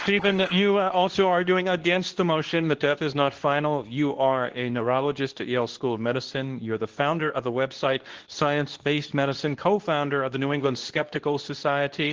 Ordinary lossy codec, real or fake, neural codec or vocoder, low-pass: Opus, 16 kbps; fake; codec, 24 kHz, 0.9 kbps, WavTokenizer, medium speech release version 2; 7.2 kHz